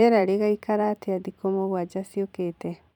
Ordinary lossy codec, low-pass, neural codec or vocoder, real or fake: none; 19.8 kHz; vocoder, 44.1 kHz, 128 mel bands every 512 samples, BigVGAN v2; fake